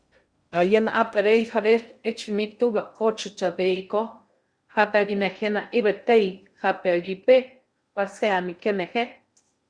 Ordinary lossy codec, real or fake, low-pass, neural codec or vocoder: Opus, 64 kbps; fake; 9.9 kHz; codec, 16 kHz in and 24 kHz out, 0.6 kbps, FocalCodec, streaming, 2048 codes